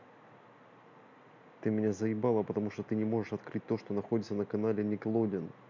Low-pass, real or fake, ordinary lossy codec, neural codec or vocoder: 7.2 kHz; real; MP3, 64 kbps; none